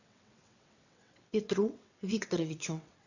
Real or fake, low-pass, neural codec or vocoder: fake; 7.2 kHz; vocoder, 22.05 kHz, 80 mel bands, WaveNeXt